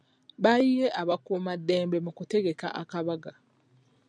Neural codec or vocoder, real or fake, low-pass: none; real; 9.9 kHz